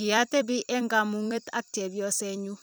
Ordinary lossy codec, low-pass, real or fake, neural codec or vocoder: none; none; fake; vocoder, 44.1 kHz, 128 mel bands every 256 samples, BigVGAN v2